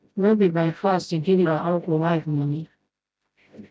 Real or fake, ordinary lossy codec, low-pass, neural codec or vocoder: fake; none; none; codec, 16 kHz, 0.5 kbps, FreqCodec, smaller model